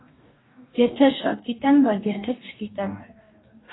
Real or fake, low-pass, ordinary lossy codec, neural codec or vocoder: fake; 7.2 kHz; AAC, 16 kbps; codec, 44.1 kHz, 2.6 kbps, DAC